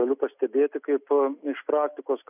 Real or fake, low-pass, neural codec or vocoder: real; 3.6 kHz; none